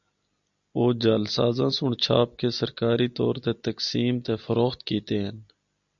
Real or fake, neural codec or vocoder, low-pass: real; none; 7.2 kHz